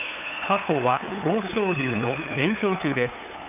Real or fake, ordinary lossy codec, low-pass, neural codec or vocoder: fake; none; 3.6 kHz; codec, 16 kHz, 8 kbps, FunCodec, trained on LibriTTS, 25 frames a second